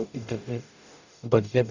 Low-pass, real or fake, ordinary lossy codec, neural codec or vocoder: 7.2 kHz; fake; none; codec, 44.1 kHz, 0.9 kbps, DAC